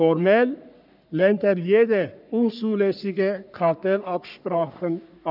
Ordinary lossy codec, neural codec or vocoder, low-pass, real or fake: none; codec, 44.1 kHz, 3.4 kbps, Pupu-Codec; 5.4 kHz; fake